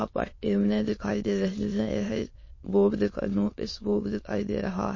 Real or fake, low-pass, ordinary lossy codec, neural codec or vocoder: fake; 7.2 kHz; MP3, 32 kbps; autoencoder, 22.05 kHz, a latent of 192 numbers a frame, VITS, trained on many speakers